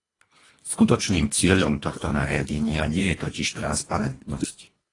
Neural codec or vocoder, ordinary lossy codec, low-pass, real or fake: codec, 24 kHz, 1.5 kbps, HILCodec; AAC, 32 kbps; 10.8 kHz; fake